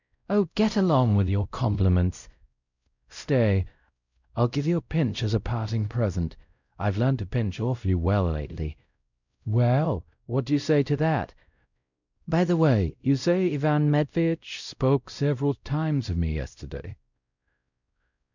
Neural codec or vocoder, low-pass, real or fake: codec, 16 kHz, 0.5 kbps, X-Codec, WavLM features, trained on Multilingual LibriSpeech; 7.2 kHz; fake